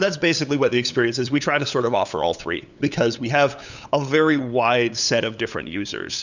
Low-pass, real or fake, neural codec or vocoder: 7.2 kHz; fake; codec, 16 kHz, 8 kbps, FunCodec, trained on LibriTTS, 25 frames a second